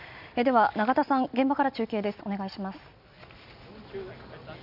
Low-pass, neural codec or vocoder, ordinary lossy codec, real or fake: 5.4 kHz; none; none; real